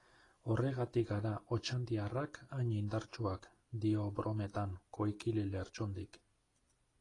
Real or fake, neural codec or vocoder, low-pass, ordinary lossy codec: real; none; 10.8 kHz; AAC, 32 kbps